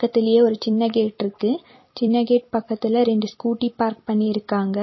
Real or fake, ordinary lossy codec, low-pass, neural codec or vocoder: fake; MP3, 24 kbps; 7.2 kHz; vocoder, 44.1 kHz, 128 mel bands every 256 samples, BigVGAN v2